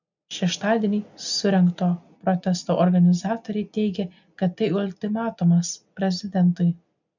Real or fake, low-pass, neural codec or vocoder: real; 7.2 kHz; none